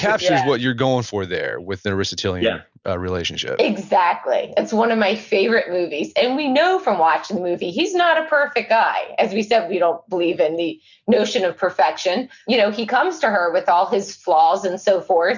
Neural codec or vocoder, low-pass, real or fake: none; 7.2 kHz; real